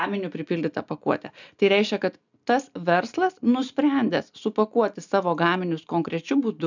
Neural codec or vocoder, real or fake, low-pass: none; real; 7.2 kHz